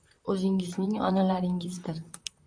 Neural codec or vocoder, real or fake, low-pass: vocoder, 44.1 kHz, 128 mel bands, Pupu-Vocoder; fake; 9.9 kHz